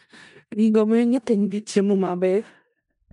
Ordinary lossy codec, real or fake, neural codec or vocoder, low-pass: none; fake; codec, 16 kHz in and 24 kHz out, 0.4 kbps, LongCat-Audio-Codec, four codebook decoder; 10.8 kHz